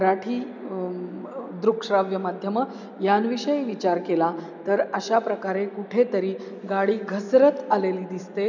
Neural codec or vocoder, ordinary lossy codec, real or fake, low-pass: none; none; real; 7.2 kHz